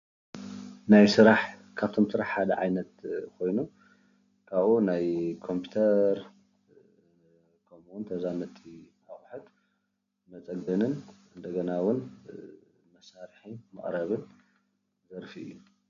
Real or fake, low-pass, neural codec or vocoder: real; 7.2 kHz; none